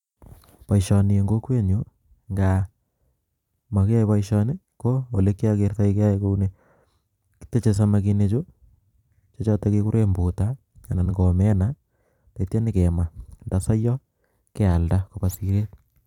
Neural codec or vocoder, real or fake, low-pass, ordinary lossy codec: vocoder, 44.1 kHz, 128 mel bands every 256 samples, BigVGAN v2; fake; 19.8 kHz; none